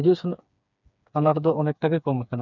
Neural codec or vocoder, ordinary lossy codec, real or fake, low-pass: codec, 16 kHz, 4 kbps, FreqCodec, smaller model; none; fake; 7.2 kHz